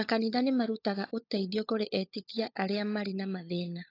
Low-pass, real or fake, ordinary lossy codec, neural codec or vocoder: 5.4 kHz; fake; AAC, 32 kbps; codec, 16 kHz, 16 kbps, FunCodec, trained on LibriTTS, 50 frames a second